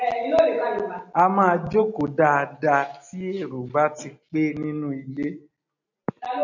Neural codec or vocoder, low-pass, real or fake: none; 7.2 kHz; real